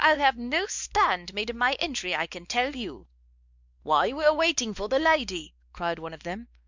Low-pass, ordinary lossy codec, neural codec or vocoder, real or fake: 7.2 kHz; Opus, 64 kbps; codec, 16 kHz, 2 kbps, X-Codec, WavLM features, trained on Multilingual LibriSpeech; fake